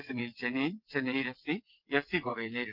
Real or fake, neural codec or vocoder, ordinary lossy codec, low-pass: fake; vocoder, 22.05 kHz, 80 mel bands, WaveNeXt; Opus, 24 kbps; 5.4 kHz